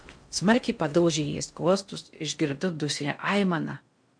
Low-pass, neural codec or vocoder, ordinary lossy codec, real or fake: 9.9 kHz; codec, 16 kHz in and 24 kHz out, 0.6 kbps, FocalCodec, streaming, 4096 codes; MP3, 64 kbps; fake